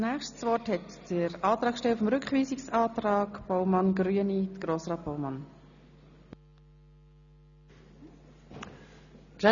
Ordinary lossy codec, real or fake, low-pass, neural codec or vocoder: none; real; 7.2 kHz; none